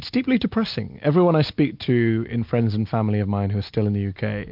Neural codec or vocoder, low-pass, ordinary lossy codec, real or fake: none; 5.4 kHz; MP3, 48 kbps; real